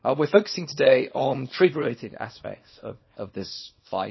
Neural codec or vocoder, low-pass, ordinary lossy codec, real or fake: codec, 24 kHz, 0.9 kbps, WavTokenizer, small release; 7.2 kHz; MP3, 24 kbps; fake